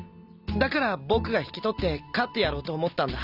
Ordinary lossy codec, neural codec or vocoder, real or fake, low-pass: none; none; real; 5.4 kHz